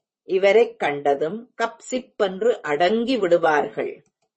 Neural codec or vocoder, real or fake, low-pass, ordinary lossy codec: vocoder, 44.1 kHz, 128 mel bands, Pupu-Vocoder; fake; 10.8 kHz; MP3, 32 kbps